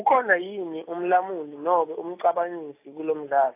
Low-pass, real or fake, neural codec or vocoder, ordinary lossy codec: 3.6 kHz; real; none; AAC, 24 kbps